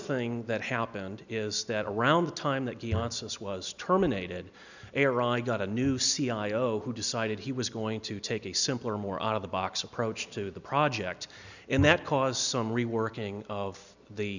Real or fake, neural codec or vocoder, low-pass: real; none; 7.2 kHz